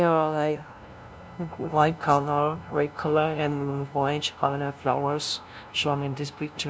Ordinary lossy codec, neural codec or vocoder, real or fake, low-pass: none; codec, 16 kHz, 0.5 kbps, FunCodec, trained on LibriTTS, 25 frames a second; fake; none